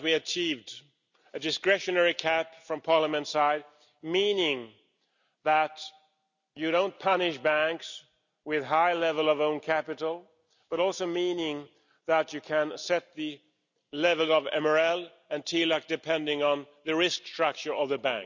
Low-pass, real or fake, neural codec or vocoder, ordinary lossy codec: 7.2 kHz; real; none; none